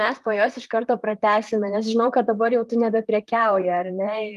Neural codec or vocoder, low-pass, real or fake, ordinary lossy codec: vocoder, 44.1 kHz, 128 mel bands, Pupu-Vocoder; 14.4 kHz; fake; Opus, 32 kbps